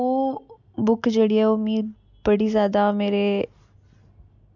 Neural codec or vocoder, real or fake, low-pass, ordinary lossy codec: none; real; 7.2 kHz; none